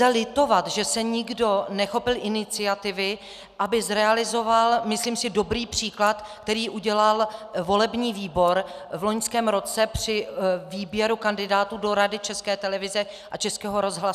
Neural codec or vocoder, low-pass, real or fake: none; 14.4 kHz; real